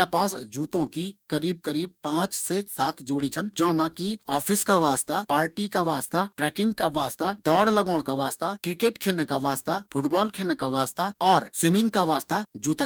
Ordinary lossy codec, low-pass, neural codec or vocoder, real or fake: none; none; codec, 44.1 kHz, 2.6 kbps, DAC; fake